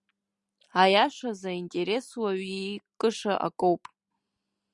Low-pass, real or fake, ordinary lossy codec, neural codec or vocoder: 10.8 kHz; real; Opus, 64 kbps; none